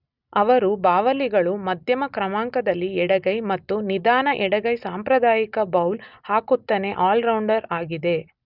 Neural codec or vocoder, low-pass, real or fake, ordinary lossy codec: none; 5.4 kHz; real; none